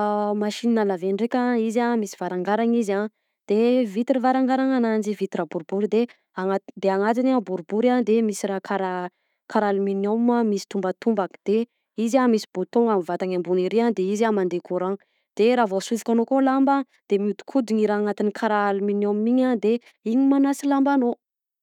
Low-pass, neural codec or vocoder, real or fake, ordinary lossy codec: 19.8 kHz; autoencoder, 48 kHz, 128 numbers a frame, DAC-VAE, trained on Japanese speech; fake; none